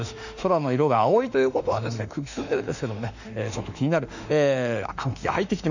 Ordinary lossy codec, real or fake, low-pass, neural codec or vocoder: none; fake; 7.2 kHz; autoencoder, 48 kHz, 32 numbers a frame, DAC-VAE, trained on Japanese speech